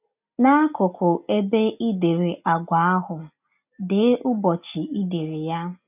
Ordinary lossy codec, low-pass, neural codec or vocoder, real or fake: none; 3.6 kHz; none; real